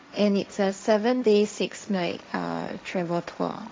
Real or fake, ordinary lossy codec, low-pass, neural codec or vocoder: fake; none; none; codec, 16 kHz, 1.1 kbps, Voila-Tokenizer